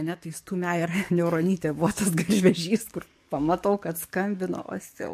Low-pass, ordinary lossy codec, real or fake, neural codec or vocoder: 14.4 kHz; MP3, 64 kbps; fake; codec, 44.1 kHz, 7.8 kbps, DAC